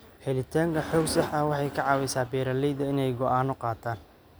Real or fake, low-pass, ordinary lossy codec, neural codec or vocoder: real; none; none; none